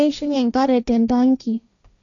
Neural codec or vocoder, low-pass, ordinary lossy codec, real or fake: codec, 16 kHz, 1.1 kbps, Voila-Tokenizer; 7.2 kHz; none; fake